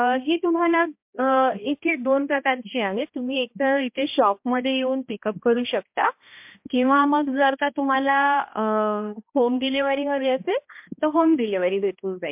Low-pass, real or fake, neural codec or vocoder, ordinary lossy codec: 3.6 kHz; fake; codec, 16 kHz, 1 kbps, X-Codec, HuBERT features, trained on balanced general audio; MP3, 32 kbps